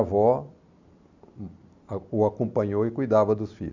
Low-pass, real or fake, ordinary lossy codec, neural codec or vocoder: 7.2 kHz; real; none; none